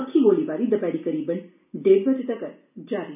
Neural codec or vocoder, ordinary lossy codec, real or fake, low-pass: none; none; real; 3.6 kHz